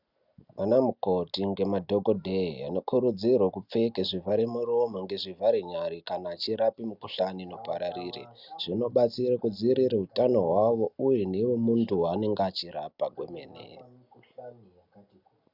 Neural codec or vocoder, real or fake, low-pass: none; real; 5.4 kHz